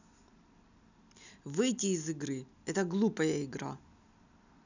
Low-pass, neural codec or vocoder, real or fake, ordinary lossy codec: 7.2 kHz; none; real; none